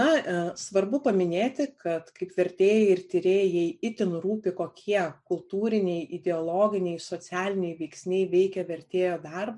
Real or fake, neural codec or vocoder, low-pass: real; none; 10.8 kHz